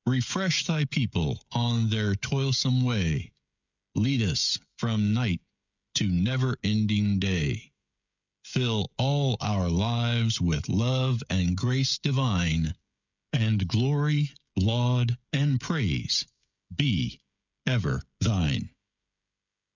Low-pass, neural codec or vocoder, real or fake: 7.2 kHz; codec, 16 kHz, 16 kbps, FreqCodec, smaller model; fake